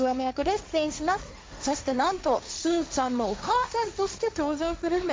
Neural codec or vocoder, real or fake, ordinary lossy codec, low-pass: codec, 16 kHz, 1.1 kbps, Voila-Tokenizer; fake; none; none